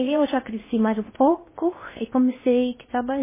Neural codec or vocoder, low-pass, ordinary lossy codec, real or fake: codec, 16 kHz in and 24 kHz out, 0.6 kbps, FocalCodec, streaming, 4096 codes; 3.6 kHz; MP3, 16 kbps; fake